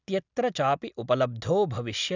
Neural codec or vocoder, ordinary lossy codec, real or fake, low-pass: none; none; real; 7.2 kHz